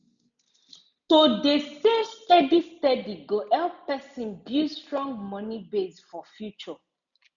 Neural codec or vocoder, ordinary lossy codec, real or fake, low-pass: none; none; real; 7.2 kHz